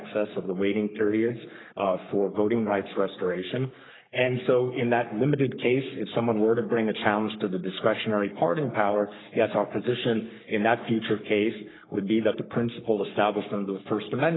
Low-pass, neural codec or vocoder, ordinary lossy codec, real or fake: 7.2 kHz; codec, 44.1 kHz, 3.4 kbps, Pupu-Codec; AAC, 16 kbps; fake